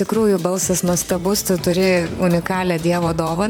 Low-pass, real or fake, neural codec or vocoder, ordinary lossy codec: 19.8 kHz; fake; vocoder, 44.1 kHz, 128 mel bands, Pupu-Vocoder; MP3, 96 kbps